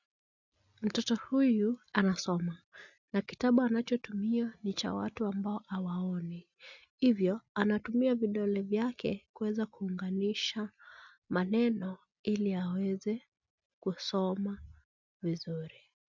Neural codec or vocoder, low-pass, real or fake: none; 7.2 kHz; real